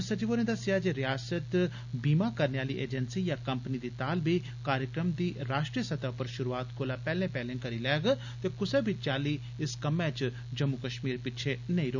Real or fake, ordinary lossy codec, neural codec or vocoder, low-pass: real; none; none; 7.2 kHz